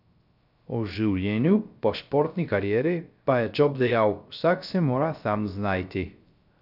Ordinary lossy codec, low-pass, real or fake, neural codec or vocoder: none; 5.4 kHz; fake; codec, 16 kHz, 0.3 kbps, FocalCodec